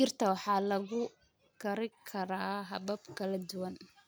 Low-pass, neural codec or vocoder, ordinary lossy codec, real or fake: none; none; none; real